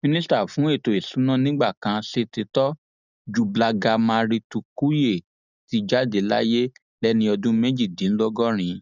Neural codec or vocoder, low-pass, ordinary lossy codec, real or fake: none; 7.2 kHz; none; real